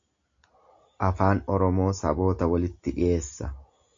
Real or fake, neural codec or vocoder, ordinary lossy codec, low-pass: real; none; AAC, 48 kbps; 7.2 kHz